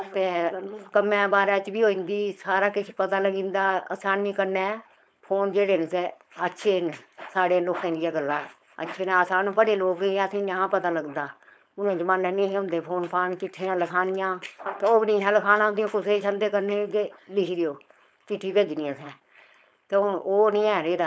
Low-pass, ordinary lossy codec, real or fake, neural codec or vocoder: none; none; fake; codec, 16 kHz, 4.8 kbps, FACodec